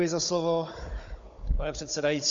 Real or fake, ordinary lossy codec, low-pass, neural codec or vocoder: fake; MP3, 48 kbps; 7.2 kHz; codec, 16 kHz, 16 kbps, FunCodec, trained on Chinese and English, 50 frames a second